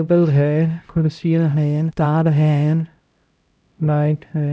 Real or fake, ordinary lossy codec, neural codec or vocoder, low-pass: fake; none; codec, 16 kHz, 0.5 kbps, X-Codec, HuBERT features, trained on LibriSpeech; none